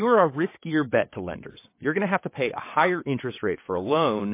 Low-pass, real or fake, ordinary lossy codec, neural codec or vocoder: 3.6 kHz; fake; MP3, 24 kbps; vocoder, 22.05 kHz, 80 mel bands, WaveNeXt